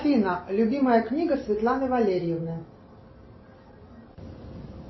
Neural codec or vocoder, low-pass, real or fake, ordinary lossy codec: none; 7.2 kHz; real; MP3, 24 kbps